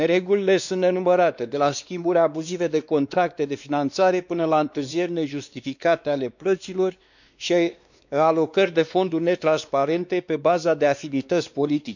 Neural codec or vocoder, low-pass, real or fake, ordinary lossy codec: codec, 16 kHz, 2 kbps, X-Codec, WavLM features, trained on Multilingual LibriSpeech; 7.2 kHz; fake; none